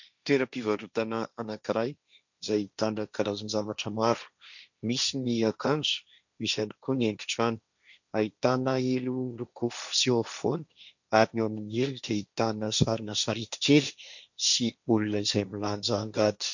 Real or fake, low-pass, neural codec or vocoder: fake; 7.2 kHz; codec, 16 kHz, 1.1 kbps, Voila-Tokenizer